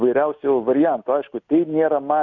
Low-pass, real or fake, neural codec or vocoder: 7.2 kHz; real; none